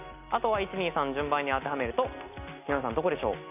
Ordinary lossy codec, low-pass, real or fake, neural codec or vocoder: none; 3.6 kHz; real; none